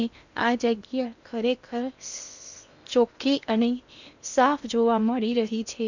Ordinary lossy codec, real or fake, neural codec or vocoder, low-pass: none; fake; codec, 16 kHz in and 24 kHz out, 0.8 kbps, FocalCodec, streaming, 65536 codes; 7.2 kHz